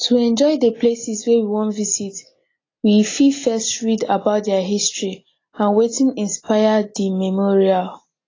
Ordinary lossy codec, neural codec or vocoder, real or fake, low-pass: AAC, 32 kbps; none; real; 7.2 kHz